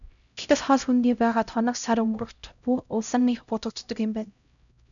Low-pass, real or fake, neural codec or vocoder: 7.2 kHz; fake; codec, 16 kHz, 0.5 kbps, X-Codec, HuBERT features, trained on LibriSpeech